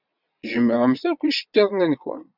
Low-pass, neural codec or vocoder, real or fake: 5.4 kHz; vocoder, 22.05 kHz, 80 mel bands, Vocos; fake